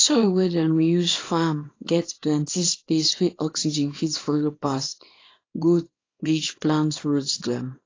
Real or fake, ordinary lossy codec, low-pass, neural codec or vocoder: fake; AAC, 32 kbps; 7.2 kHz; codec, 24 kHz, 0.9 kbps, WavTokenizer, small release